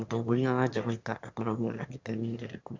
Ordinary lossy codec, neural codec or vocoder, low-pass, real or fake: MP3, 64 kbps; autoencoder, 22.05 kHz, a latent of 192 numbers a frame, VITS, trained on one speaker; 7.2 kHz; fake